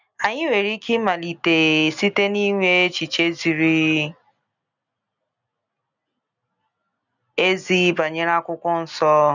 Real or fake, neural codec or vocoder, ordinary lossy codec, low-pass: real; none; none; 7.2 kHz